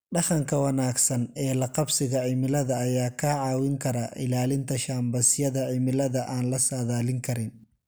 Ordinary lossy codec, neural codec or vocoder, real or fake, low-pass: none; none; real; none